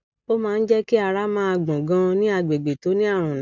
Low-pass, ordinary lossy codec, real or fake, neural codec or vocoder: 7.2 kHz; none; real; none